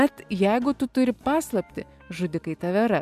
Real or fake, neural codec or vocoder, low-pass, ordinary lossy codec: real; none; 14.4 kHz; AAC, 96 kbps